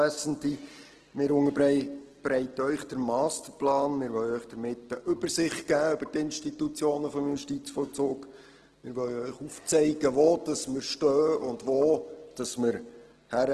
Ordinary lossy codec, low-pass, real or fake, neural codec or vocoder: Opus, 16 kbps; 10.8 kHz; real; none